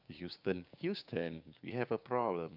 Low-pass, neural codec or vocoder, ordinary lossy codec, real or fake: 5.4 kHz; codec, 16 kHz, 6 kbps, DAC; none; fake